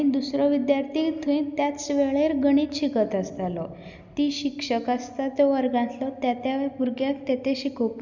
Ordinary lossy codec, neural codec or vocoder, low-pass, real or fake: none; none; 7.2 kHz; real